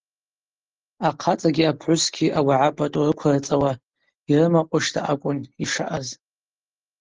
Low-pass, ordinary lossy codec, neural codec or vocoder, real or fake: 7.2 kHz; Opus, 16 kbps; none; real